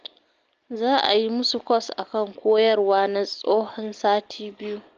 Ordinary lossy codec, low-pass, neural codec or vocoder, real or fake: Opus, 24 kbps; 7.2 kHz; none; real